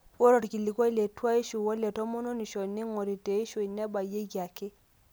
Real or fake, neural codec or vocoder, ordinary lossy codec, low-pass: real; none; none; none